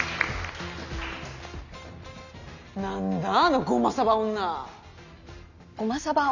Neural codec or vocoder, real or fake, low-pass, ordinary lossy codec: none; real; 7.2 kHz; none